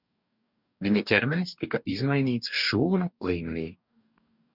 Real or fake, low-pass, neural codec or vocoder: fake; 5.4 kHz; codec, 44.1 kHz, 2.6 kbps, DAC